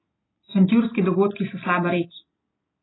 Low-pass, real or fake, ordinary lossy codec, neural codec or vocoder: 7.2 kHz; real; AAC, 16 kbps; none